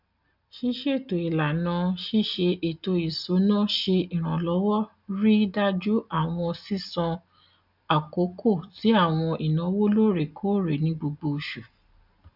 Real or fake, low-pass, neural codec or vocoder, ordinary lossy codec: real; 5.4 kHz; none; none